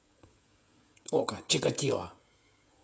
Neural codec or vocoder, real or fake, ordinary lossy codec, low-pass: codec, 16 kHz, 16 kbps, FreqCodec, smaller model; fake; none; none